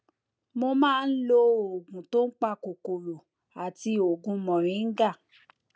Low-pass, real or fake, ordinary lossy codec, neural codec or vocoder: none; real; none; none